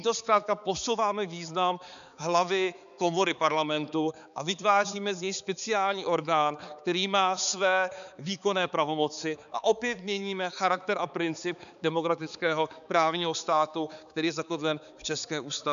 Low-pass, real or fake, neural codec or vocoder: 7.2 kHz; fake; codec, 16 kHz, 4 kbps, X-Codec, HuBERT features, trained on balanced general audio